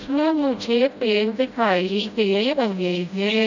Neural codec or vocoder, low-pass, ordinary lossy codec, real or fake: codec, 16 kHz, 0.5 kbps, FreqCodec, smaller model; 7.2 kHz; none; fake